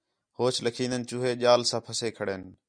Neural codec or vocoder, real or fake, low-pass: none; real; 9.9 kHz